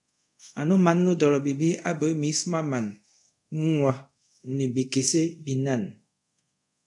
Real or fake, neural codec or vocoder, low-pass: fake; codec, 24 kHz, 0.5 kbps, DualCodec; 10.8 kHz